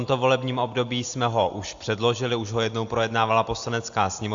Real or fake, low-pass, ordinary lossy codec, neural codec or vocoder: real; 7.2 kHz; AAC, 64 kbps; none